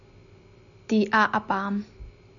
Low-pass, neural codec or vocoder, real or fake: 7.2 kHz; none; real